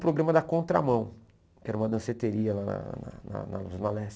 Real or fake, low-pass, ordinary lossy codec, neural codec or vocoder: real; none; none; none